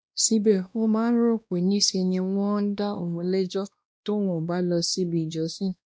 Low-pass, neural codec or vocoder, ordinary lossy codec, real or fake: none; codec, 16 kHz, 1 kbps, X-Codec, WavLM features, trained on Multilingual LibriSpeech; none; fake